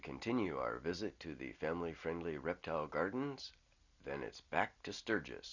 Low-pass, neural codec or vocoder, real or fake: 7.2 kHz; none; real